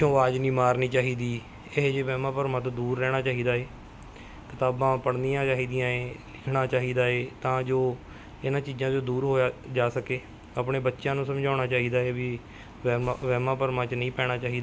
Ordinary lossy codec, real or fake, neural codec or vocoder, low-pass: none; real; none; none